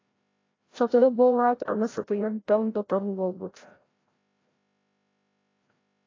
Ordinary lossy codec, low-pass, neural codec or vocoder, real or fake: AAC, 32 kbps; 7.2 kHz; codec, 16 kHz, 0.5 kbps, FreqCodec, larger model; fake